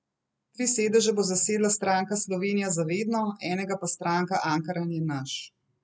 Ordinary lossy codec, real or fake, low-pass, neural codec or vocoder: none; real; none; none